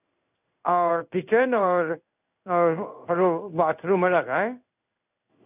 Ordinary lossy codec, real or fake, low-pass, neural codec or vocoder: none; fake; 3.6 kHz; codec, 16 kHz in and 24 kHz out, 1 kbps, XY-Tokenizer